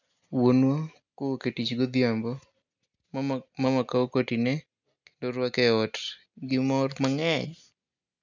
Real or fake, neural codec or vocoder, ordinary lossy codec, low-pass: real; none; none; 7.2 kHz